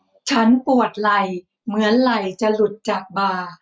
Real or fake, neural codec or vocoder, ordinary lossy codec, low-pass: real; none; none; none